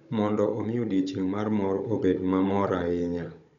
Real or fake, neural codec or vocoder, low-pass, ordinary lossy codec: fake; codec, 16 kHz, 16 kbps, FunCodec, trained on Chinese and English, 50 frames a second; 7.2 kHz; none